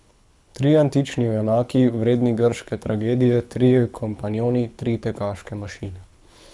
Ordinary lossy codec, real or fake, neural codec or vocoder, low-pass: none; fake; codec, 24 kHz, 6 kbps, HILCodec; none